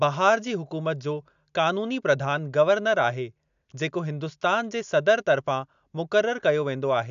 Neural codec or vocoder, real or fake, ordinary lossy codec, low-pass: none; real; AAC, 96 kbps; 7.2 kHz